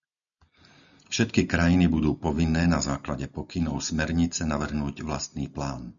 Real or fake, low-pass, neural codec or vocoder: real; 7.2 kHz; none